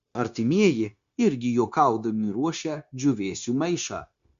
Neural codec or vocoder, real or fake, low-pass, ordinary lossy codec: codec, 16 kHz, 0.9 kbps, LongCat-Audio-Codec; fake; 7.2 kHz; Opus, 64 kbps